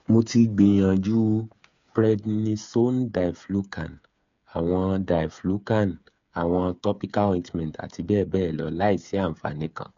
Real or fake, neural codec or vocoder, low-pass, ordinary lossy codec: fake; codec, 16 kHz, 8 kbps, FreqCodec, smaller model; 7.2 kHz; MP3, 64 kbps